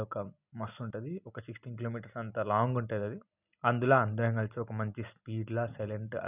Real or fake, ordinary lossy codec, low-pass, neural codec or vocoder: real; none; 3.6 kHz; none